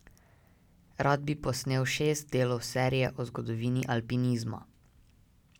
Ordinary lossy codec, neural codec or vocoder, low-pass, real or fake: none; none; 19.8 kHz; real